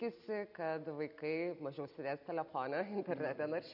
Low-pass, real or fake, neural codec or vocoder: 5.4 kHz; real; none